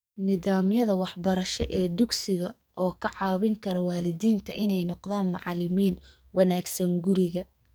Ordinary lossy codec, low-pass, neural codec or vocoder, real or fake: none; none; codec, 44.1 kHz, 2.6 kbps, SNAC; fake